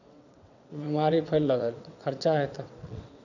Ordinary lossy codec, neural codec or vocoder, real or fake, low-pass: none; vocoder, 22.05 kHz, 80 mel bands, WaveNeXt; fake; 7.2 kHz